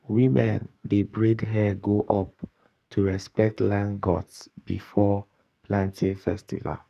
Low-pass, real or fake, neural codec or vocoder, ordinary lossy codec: 14.4 kHz; fake; codec, 44.1 kHz, 2.6 kbps, SNAC; none